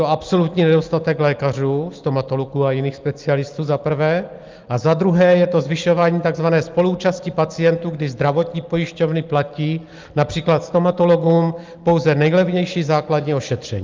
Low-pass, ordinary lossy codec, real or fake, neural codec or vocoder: 7.2 kHz; Opus, 24 kbps; real; none